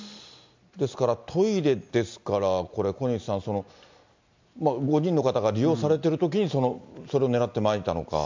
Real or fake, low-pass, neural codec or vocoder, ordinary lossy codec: real; 7.2 kHz; none; MP3, 64 kbps